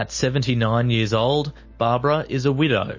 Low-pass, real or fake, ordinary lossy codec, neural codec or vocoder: 7.2 kHz; real; MP3, 32 kbps; none